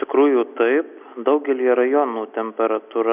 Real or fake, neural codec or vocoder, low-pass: real; none; 3.6 kHz